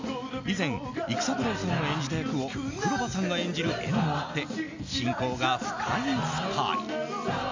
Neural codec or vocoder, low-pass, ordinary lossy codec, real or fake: none; 7.2 kHz; MP3, 64 kbps; real